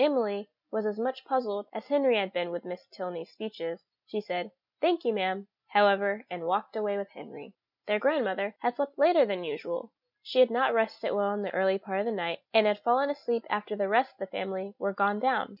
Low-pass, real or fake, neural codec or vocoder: 5.4 kHz; real; none